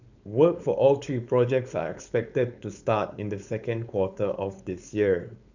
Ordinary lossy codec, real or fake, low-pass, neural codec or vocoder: none; fake; 7.2 kHz; codec, 16 kHz, 4.8 kbps, FACodec